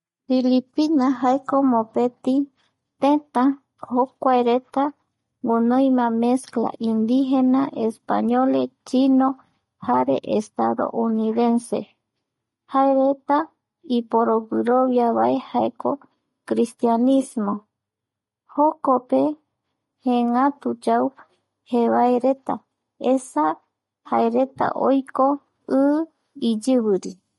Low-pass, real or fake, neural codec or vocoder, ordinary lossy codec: 19.8 kHz; real; none; MP3, 48 kbps